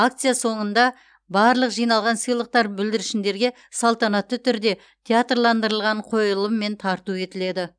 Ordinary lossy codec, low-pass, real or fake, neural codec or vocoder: none; 9.9 kHz; real; none